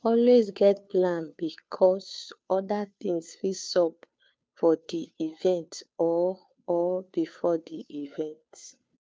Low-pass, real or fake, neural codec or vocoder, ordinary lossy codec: none; fake; codec, 16 kHz, 2 kbps, FunCodec, trained on Chinese and English, 25 frames a second; none